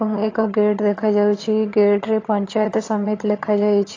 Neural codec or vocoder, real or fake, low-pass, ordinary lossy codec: vocoder, 22.05 kHz, 80 mel bands, HiFi-GAN; fake; 7.2 kHz; AAC, 32 kbps